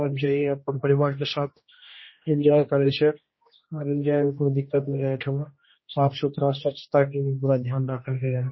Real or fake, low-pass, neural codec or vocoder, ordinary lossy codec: fake; 7.2 kHz; codec, 16 kHz, 1 kbps, X-Codec, HuBERT features, trained on general audio; MP3, 24 kbps